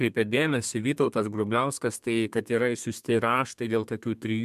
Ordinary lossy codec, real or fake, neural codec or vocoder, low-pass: MP3, 96 kbps; fake; codec, 32 kHz, 1.9 kbps, SNAC; 14.4 kHz